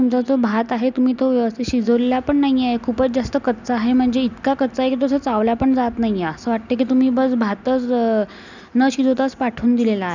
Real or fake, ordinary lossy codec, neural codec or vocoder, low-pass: real; none; none; 7.2 kHz